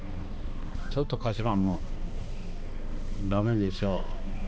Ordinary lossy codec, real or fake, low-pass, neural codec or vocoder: none; fake; none; codec, 16 kHz, 2 kbps, X-Codec, HuBERT features, trained on balanced general audio